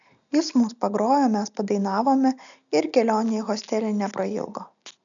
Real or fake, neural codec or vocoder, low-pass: real; none; 7.2 kHz